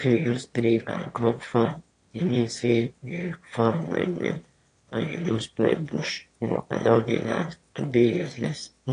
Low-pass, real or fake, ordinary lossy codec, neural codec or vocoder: 9.9 kHz; fake; AAC, 48 kbps; autoencoder, 22.05 kHz, a latent of 192 numbers a frame, VITS, trained on one speaker